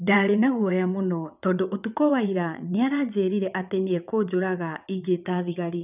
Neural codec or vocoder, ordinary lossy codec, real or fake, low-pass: vocoder, 22.05 kHz, 80 mel bands, WaveNeXt; none; fake; 3.6 kHz